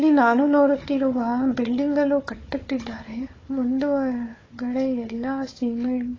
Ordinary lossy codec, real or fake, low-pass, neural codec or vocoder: AAC, 32 kbps; fake; 7.2 kHz; codec, 16 kHz, 4 kbps, FunCodec, trained on Chinese and English, 50 frames a second